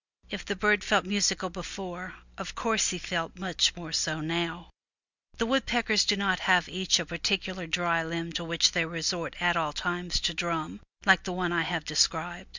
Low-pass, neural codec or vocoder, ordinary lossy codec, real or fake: 7.2 kHz; none; Opus, 64 kbps; real